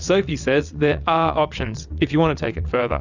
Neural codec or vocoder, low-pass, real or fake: vocoder, 22.05 kHz, 80 mel bands, WaveNeXt; 7.2 kHz; fake